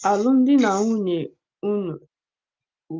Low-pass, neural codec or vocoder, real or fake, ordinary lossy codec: 7.2 kHz; none; real; Opus, 24 kbps